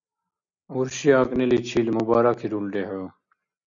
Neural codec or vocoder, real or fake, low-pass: none; real; 7.2 kHz